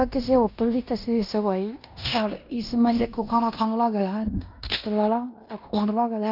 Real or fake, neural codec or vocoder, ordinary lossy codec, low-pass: fake; codec, 16 kHz in and 24 kHz out, 0.9 kbps, LongCat-Audio-Codec, fine tuned four codebook decoder; none; 5.4 kHz